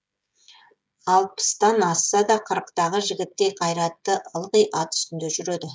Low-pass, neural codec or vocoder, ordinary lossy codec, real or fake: none; codec, 16 kHz, 16 kbps, FreqCodec, smaller model; none; fake